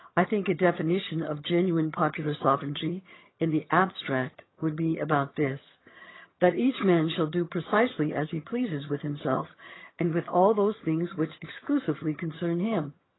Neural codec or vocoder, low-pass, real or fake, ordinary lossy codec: vocoder, 22.05 kHz, 80 mel bands, HiFi-GAN; 7.2 kHz; fake; AAC, 16 kbps